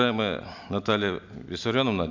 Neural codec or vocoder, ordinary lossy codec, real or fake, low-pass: none; none; real; 7.2 kHz